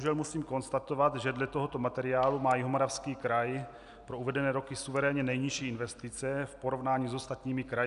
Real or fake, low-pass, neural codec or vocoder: real; 10.8 kHz; none